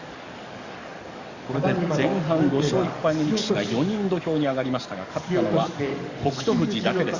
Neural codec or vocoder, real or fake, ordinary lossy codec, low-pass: codec, 44.1 kHz, 7.8 kbps, DAC; fake; Opus, 64 kbps; 7.2 kHz